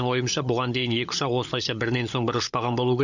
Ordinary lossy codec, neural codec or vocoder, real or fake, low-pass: none; codec, 16 kHz, 16 kbps, FunCodec, trained on LibriTTS, 50 frames a second; fake; 7.2 kHz